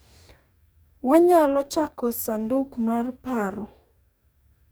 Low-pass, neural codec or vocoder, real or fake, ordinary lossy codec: none; codec, 44.1 kHz, 2.6 kbps, DAC; fake; none